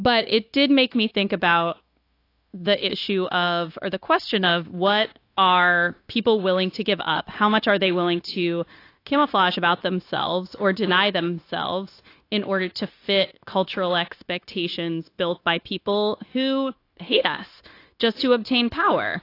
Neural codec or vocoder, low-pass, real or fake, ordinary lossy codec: codec, 16 kHz, 0.9 kbps, LongCat-Audio-Codec; 5.4 kHz; fake; AAC, 32 kbps